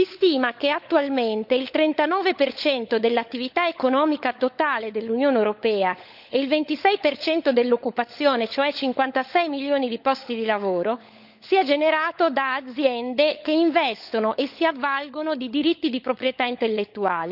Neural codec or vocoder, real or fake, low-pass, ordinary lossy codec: codec, 16 kHz, 16 kbps, FunCodec, trained on LibriTTS, 50 frames a second; fake; 5.4 kHz; none